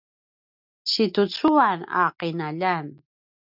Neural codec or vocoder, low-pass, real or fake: none; 5.4 kHz; real